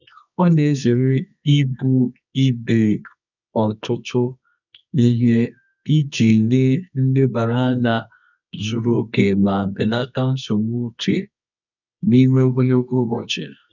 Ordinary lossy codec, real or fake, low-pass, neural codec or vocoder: none; fake; 7.2 kHz; codec, 24 kHz, 0.9 kbps, WavTokenizer, medium music audio release